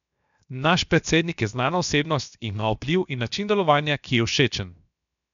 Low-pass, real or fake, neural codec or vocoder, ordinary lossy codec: 7.2 kHz; fake; codec, 16 kHz, 0.7 kbps, FocalCodec; none